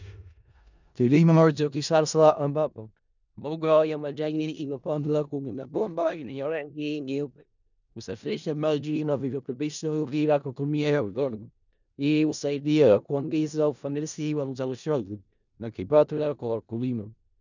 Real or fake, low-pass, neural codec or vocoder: fake; 7.2 kHz; codec, 16 kHz in and 24 kHz out, 0.4 kbps, LongCat-Audio-Codec, four codebook decoder